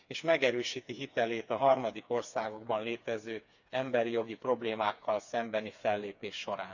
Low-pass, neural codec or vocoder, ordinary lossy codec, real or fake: 7.2 kHz; codec, 16 kHz, 4 kbps, FreqCodec, smaller model; none; fake